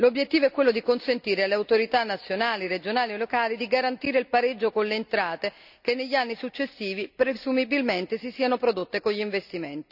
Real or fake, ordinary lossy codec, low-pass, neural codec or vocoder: real; none; 5.4 kHz; none